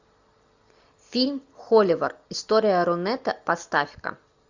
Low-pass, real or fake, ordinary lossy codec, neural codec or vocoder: 7.2 kHz; real; Opus, 64 kbps; none